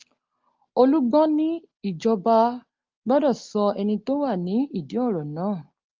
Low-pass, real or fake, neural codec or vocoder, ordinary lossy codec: 7.2 kHz; real; none; Opus, 32 kbps